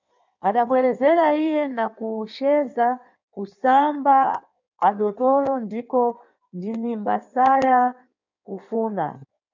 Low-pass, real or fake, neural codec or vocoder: 7.2 kHz; fake; codec, 16 kHz in and 24 kHz out, 1.1 kbps, FireRedTTS-2 codec